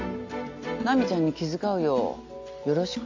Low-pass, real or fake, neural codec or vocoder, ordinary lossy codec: 7.2 kHz; real; none; AAC, 48 kbps